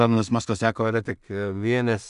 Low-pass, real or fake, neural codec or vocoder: 10.8 kHz; fake; codec, 16 kHz in and 24 kHz out, 0.4 kbps, LongCat-Audio-Codec, two codebook decoder